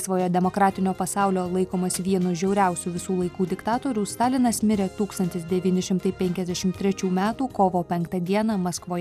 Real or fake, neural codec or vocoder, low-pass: real; none; 14.4 kHz